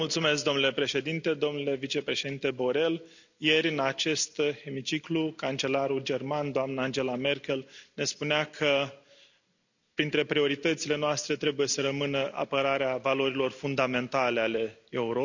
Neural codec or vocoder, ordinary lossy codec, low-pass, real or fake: none; none; 7.2 kHz; real